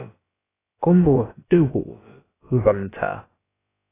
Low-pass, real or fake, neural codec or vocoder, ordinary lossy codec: 3.6 kHz; fake; codec, 16 kHz, about 1 kbps, DyCAST, with the encoder's durations; AAC, 16 kbps